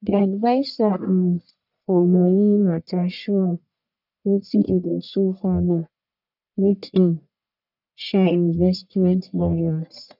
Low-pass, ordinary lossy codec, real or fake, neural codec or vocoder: 5.4 kHz; none; fake; codec, 44.1 kHz, 1.7 kbps, Pupu-Codec